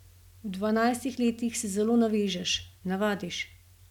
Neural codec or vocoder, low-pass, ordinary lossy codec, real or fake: none; 19.8 kHz; none; real